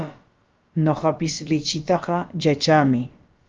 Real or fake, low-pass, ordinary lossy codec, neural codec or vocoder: fake; 7.2 kHz; Opus, 32 kbps; codec, 16 kHz, about 1 kbps, DyCAST, with the encoder's durations